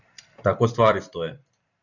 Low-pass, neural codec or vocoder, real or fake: 7.2 kHz; vocoder, 44.1 kHz, 128 mel bands every 256 samples, BigVGAN v2; fake